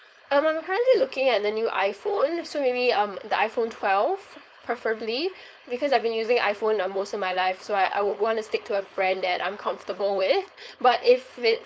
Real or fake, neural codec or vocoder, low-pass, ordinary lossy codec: fake; codec, 16 kHz, 4.8 kbps, FACodec; none; none